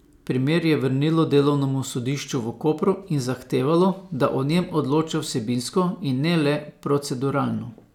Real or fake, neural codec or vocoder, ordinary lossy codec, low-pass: real; none; none; 19.8 kHz